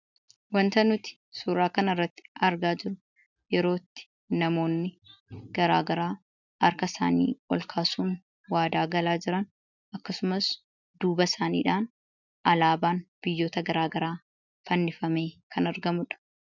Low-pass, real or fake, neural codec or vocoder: 7.2 kHz; real; none